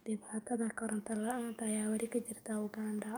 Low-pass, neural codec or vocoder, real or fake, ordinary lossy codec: none; codec, 44.1 kHz, 7.8 kbps, DAC; fake; none